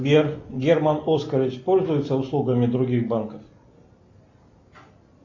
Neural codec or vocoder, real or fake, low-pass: none; real; 7.2 kHz